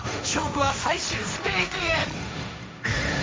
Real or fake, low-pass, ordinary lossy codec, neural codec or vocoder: fake; none; none; codec, 16 kHz, 1.1 kbps, Voila-Tokenizer